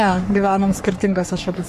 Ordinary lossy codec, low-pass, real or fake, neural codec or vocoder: MP3, 64 kbps; 14.4 kHz; fake; codec, 44.1 kHz, 3.4 kbps, Pupu-Codec